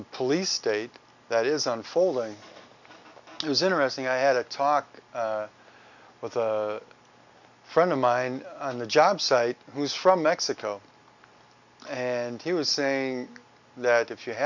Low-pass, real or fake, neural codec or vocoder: 7.2 kHz; real; none